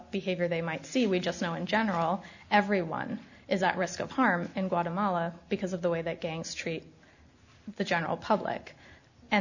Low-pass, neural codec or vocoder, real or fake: 7.2 kHz; none; real